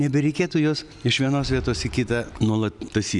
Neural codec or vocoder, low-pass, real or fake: none; 10.8 kHz; real